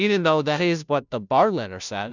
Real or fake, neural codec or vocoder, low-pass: fake; codec, 16 kHz, 0.5 kbps, FunCodec, trained on Chinese and English, 25 frames a second; 7.2 kHz